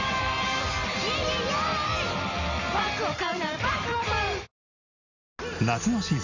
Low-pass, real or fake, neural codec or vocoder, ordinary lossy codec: 7.2 kHz; real; none; Opus, 64 kbps